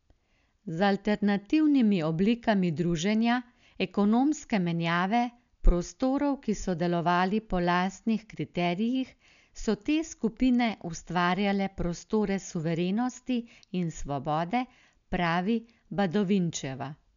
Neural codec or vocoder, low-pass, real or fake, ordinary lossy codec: none; 7.2 kHz; real; none